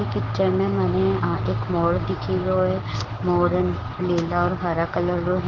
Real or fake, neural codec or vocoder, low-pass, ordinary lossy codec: fake; codec, 16 kHz, 6 kbps, DAC; 7.2 kHz; Opus, 16 kbps